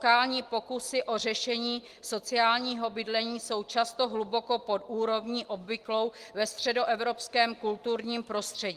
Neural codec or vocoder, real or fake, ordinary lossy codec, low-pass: none; real; Opus, 24 kbps; 14.4 kHz